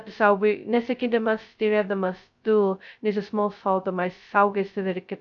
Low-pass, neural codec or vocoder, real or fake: 7.2 kHz; codec, 16 kHz, 0.2 kbps, FocalCodec; fake